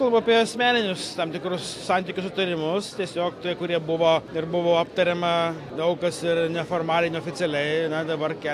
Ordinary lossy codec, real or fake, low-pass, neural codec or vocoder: AAC, 64 kbps; real; 14.4 kHz; none